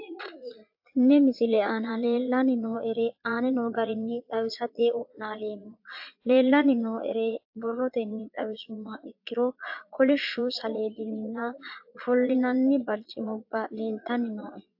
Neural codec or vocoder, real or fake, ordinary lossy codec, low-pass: vocoder, 22.05 kHz, 80 mel bands, Vocos; fake; AAC, 48 kbps; 5.4 kHz